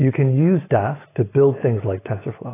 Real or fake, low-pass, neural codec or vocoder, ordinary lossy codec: real; 3.6 kHz; none; AAC, 16 kbps